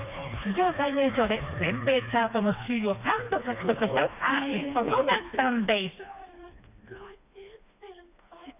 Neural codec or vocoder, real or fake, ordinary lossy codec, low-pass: codec, 16 kHz, 2 kbps, FreqCodec, smaller model; fake; none; 3.6 kHz